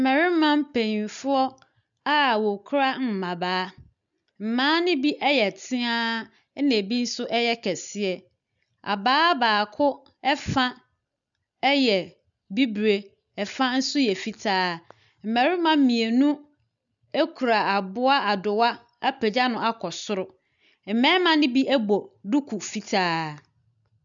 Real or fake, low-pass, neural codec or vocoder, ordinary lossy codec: real; 7.2 kHz; none; MP3, 96 kbps